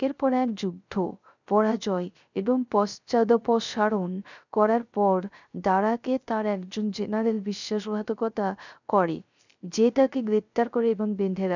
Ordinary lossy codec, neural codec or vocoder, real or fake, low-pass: none; codec, 16 kHz, 0.3 kbps, FocalCodec; fake; 7.2 kHz